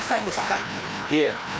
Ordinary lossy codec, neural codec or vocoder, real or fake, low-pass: none; codec, 16 kHz, 1 kbps, FreqCodec, larger model; fake; none